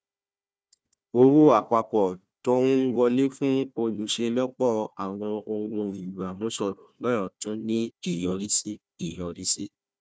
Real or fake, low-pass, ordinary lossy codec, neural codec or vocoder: fake; none; none; codec, 16 kHz, 1 kbps, FunCodec, trained on Chinese and English, 50 frames a second